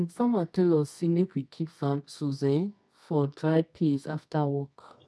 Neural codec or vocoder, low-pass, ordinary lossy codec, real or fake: codec, 24 kHz, 0.9 kbps, WavTokenizer, medium music audio release; none; none; fake